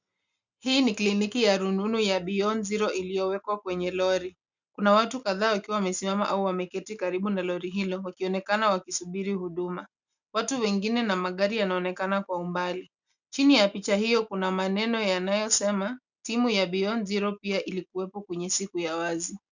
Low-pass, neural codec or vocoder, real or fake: 7.2 kHz; none; real